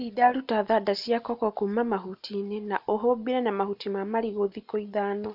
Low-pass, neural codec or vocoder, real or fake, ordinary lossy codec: 5.4 kHz; none; real; AAC, 48 kbps